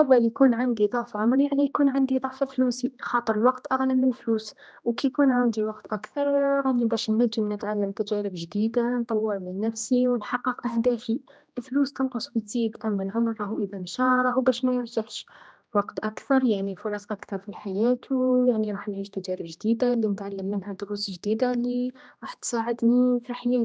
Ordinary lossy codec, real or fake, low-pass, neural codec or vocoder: none; fake; none; codec, 16 kHz, 1 kbps, X-Codec, HuBERT features, trained on general audio